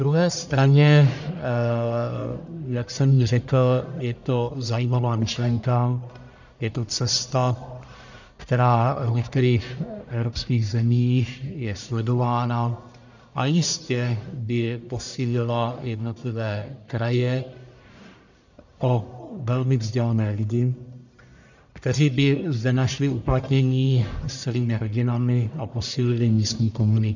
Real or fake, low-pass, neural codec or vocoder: fake; 7.2 kHz; codec, 44.1 kHz, 1.7 kbps, Pupu-Codec